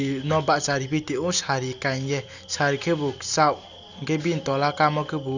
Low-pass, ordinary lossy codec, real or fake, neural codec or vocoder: 7.2 kHz; none; real; none